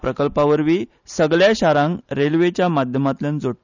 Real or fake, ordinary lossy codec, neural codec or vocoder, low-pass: real; none; none; 7.2 kHz